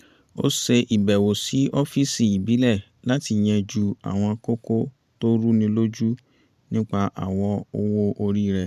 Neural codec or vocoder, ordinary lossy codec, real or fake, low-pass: vocoder, 44.1 kHz, 128 mel bands every 512 samples, BigVGAN v2; none; fake; 14.4 kHz